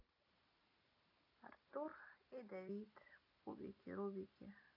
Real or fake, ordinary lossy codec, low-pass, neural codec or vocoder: real; AAC, 48 kbps; 5.4 kHz; none